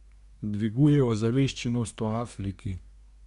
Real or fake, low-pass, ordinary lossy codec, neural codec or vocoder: fake; 10.8 kHz; none; codec, 24 kHz, 1 kbps, SNAC